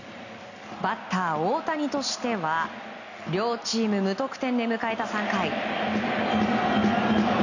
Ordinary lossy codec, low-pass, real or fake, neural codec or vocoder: none; 7.2 kHz; real; none